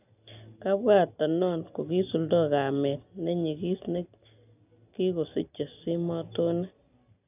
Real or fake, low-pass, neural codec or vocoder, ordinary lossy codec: real; 3.6 kHz; none; none